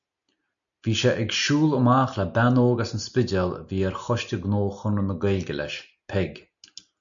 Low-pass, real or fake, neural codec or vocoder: 7.2 kHz; real; none